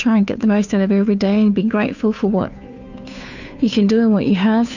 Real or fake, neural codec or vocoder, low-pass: fake; codec, 16 kHz, 2 kbps, FunCodec, trained on Chinese and English, 25 frames a second; 7.2 kHz